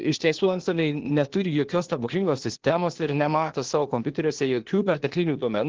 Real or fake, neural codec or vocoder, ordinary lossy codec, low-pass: fake; codec, 16 kHz, 0.8 kbps, ZipCodec; Opus, 16 kbps; 7.2 kHz